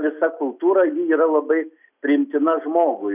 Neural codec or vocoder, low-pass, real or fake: none; 3.6 kHz; real